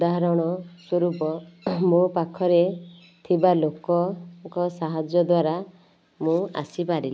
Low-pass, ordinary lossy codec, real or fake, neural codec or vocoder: none; none; real; none